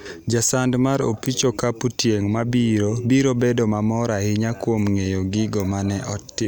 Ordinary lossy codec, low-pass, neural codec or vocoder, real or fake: none; none; none; real